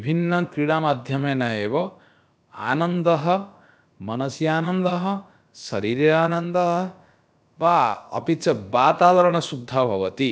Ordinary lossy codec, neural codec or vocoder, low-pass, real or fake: none; codec, 16 kHz, about 1 kbps, DyCAST, with the encoder's durations; none; fake